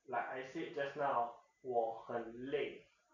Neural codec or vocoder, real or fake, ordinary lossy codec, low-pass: none; real; none; 7.2 kHz